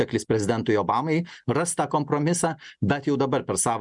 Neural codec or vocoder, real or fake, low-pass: none; real; 10.8 kHz